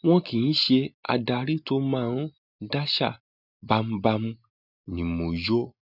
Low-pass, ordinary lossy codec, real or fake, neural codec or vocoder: 5.4 kHz; none; real; none